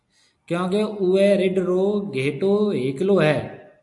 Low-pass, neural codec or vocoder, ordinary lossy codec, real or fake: 10.8 kHz; none; AAC, 64 kbps; real